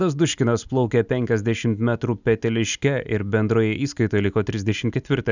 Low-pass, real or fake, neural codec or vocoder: 7.2 kHz; real; none